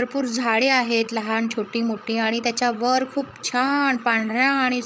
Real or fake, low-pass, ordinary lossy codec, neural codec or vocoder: fake; none; none; codec, 16 kHz, 16 kbps, FreqCodec, larger model